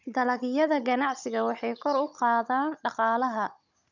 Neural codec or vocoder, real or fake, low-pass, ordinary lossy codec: codec, 16 kHz, 16 kbps, FunCodec, trained on Chinese and English, 50 frames a second; fake; 7.2 kHz; none